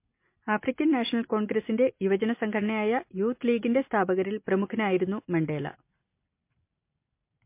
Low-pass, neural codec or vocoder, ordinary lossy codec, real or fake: 3.6 kHz; none; MP3, 24 kbps; real